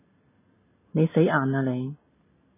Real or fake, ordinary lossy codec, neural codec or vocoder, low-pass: real; MP3, 16 kbps; none; 3.6 kHz